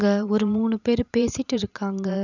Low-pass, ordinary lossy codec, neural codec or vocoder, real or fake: 7.2 kHz; none; vocoder, 44.1 kHz, 128 mel bands every 512 samples, BigVGAN v2; fake